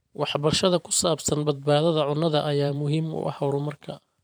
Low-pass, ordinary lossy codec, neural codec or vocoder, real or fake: none; none; vocoder, 44.1 kHz, 128 mel bands every 512 samples, BigVGAN v2; fake